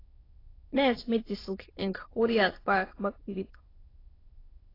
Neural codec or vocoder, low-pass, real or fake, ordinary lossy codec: autoencoder, 22.05 kHz, a latent of 192 numbers a frame, VITS, trained on many speakers; 5.4 kHz; fake; AAC, 24 kbps